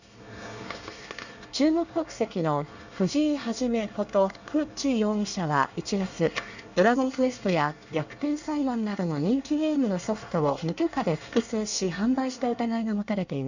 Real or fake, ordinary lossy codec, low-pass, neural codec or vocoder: fake; none; 7.2 kHz; codec, 24 kHz, 1 kbps, SNAC